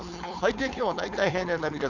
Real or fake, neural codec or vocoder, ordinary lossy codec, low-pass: fake; codec, 16 kHz, 4.8 kbps, FACodec; none; 7.2 kHz